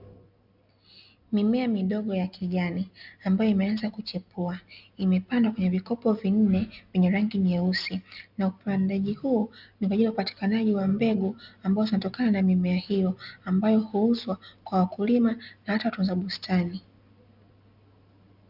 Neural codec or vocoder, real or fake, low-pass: none; real; 5.4 kHz